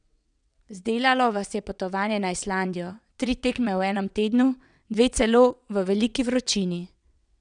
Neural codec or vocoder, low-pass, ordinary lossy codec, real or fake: vocoder, 22.05 kHz, 80 mel bands, WaveNeXt; 9.9 kHz; none; fake